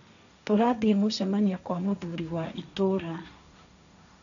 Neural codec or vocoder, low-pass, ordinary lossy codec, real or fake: codec, 16 kHz, 1.1 kbps, Voila-Tokenizer; 7.2 kHz; none; fake